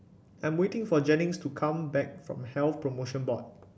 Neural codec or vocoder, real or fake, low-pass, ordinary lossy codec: none; real; none; none